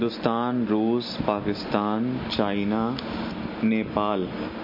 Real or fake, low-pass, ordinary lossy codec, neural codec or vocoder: real; 5.4 kHz; MP3, 32 kbps; none